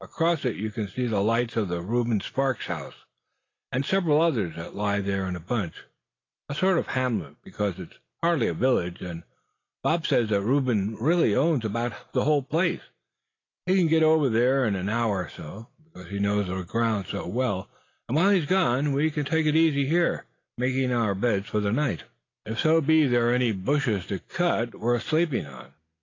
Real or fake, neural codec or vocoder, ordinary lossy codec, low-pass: real; none; AAC, 32 kbps; 7.2 kHz